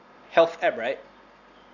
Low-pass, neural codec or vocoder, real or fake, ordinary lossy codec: 7.2 kHz; none; real; none